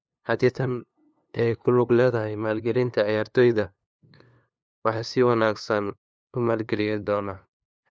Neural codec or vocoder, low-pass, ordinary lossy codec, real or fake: codec, 16 kHz, 2 kbps, FunCodec, trained on LibriTTS, 25 frames a second; none; none; fake